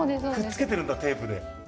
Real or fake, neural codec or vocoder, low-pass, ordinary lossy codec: real; none; none; none